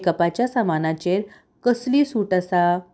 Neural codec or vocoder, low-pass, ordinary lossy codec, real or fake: none; none; none; real